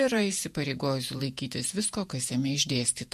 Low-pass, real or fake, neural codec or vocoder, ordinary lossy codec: 14.4 kHz; fake; vocoder, 48 kHz, 128 mel bands, Vocos; MP3, 64 kbps